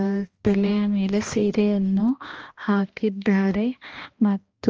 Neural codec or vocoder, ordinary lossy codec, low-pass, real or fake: codec, 16 kHz, 1 kbps, X-Codec, HuBERT features, trained on balanced general audio; Opus, 24 kbps; 7.2 kHz; fake